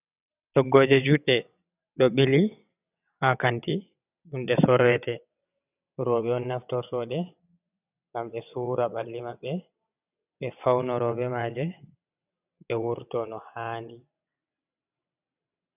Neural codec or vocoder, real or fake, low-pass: vocoder, 22.05 kHz, 80 mel bands, WaveNeXt; fake; 3.6 kHz